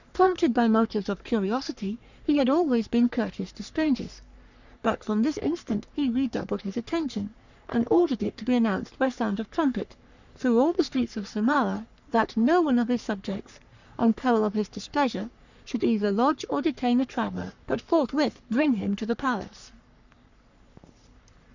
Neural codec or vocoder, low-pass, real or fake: codec, 44.1 kHz, 3.4 kbps, Pupu-Codec; 7.2 kHz; fake